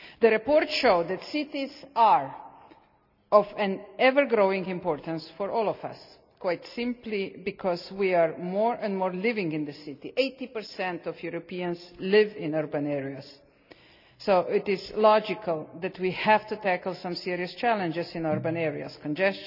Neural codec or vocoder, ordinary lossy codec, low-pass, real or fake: none; none; 5.4 kHz; real